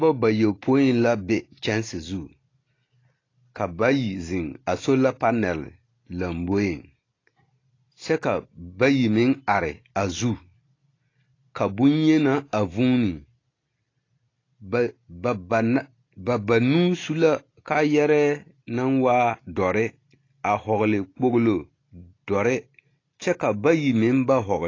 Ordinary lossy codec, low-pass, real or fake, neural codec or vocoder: AAC, 32 kbps; 7.2 kHz; real; none